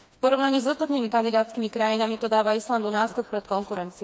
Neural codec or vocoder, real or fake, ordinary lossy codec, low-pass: codec, 16 kHz, 2 kbps, FreqCodec, smaller model; fake; none; none